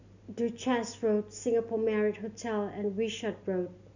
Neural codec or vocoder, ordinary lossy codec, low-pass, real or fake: none; none; 7.2 kHz; real